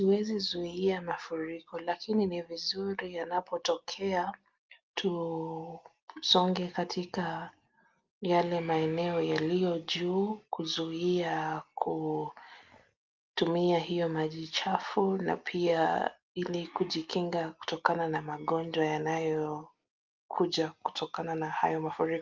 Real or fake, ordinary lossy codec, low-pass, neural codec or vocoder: real; Opus, 32 kbps; 7.2 kHz; none